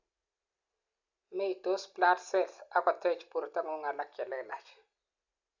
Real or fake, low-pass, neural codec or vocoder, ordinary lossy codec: real; 7.2 kHz; none; none